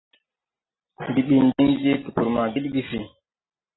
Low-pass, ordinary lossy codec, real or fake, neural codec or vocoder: 7.2 kHz; AAC, 16 kbps; real; none